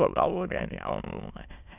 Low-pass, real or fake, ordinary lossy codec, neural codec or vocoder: 3.6 kHz; fake; none; autoencoder, 22.05 kHz, a latent of 192 numbers a frame, VITS, trained on many speakers